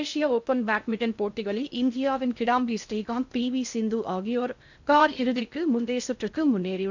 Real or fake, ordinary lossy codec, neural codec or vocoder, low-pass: fake; none; codec, 16 kHz in and 24 kHz out, 0.6 kbps, FocalCodec, streaming, 2048 codes; 7.2 kHz